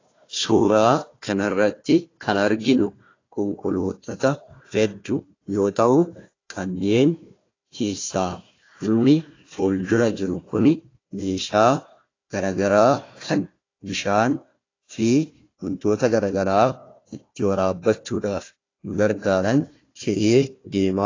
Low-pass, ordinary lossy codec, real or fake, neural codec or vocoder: 7.2 kHz; AAC, 32 kbps; fake; codec, 16 kHz, 1 kbps, FunCodec, trained on Chinese and English, 50 frames a second